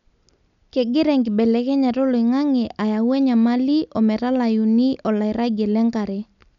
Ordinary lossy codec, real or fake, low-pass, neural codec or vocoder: MP3, 96 kbps; real; 7.2 kHz; none